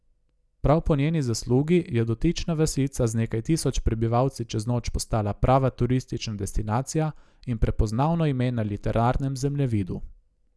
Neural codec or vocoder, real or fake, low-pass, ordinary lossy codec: none; real; none; none